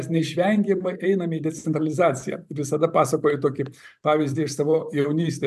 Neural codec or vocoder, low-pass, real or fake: vocoder, 44.1 kHz, 128 mel bands every 512 samples, BigVGAN v2; 14.4 kHz; fake